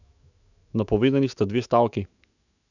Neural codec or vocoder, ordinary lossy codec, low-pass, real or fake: autoencoder, 48 kHz, 128 numbers a frame, DAC-VAE, trained on Japanese speech; none; 7.2 kHz; fake